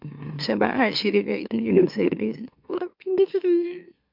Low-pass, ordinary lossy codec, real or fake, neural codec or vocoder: 5.4 kHz; MP3, 48 kbps; fake; autoencoder, 44.1 kHz, a latent of 192 numbers a frame, MeloTTS